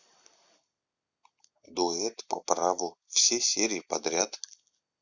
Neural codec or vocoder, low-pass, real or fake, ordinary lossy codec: none; 7.2 kHz; real; Opus, 64 kbps